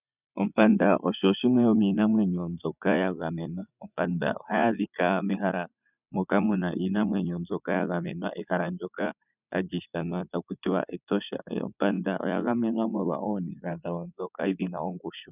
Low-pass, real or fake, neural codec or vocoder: 3.6 kHz; fake; vocoder, 44.1 kHz, 80 mel bands, Vocos